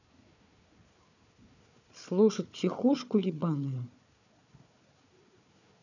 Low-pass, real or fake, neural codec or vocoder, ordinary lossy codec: 7.2 kHz; fake; codec, 16 kHz, 4 kbps, FunCodec, trained on Chinese and English, 50 frames a second; none